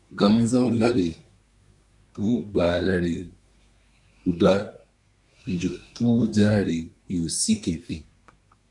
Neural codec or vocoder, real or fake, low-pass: codec, 24 kHz, 1 kbps, SNAC; fake; 10.8 kHz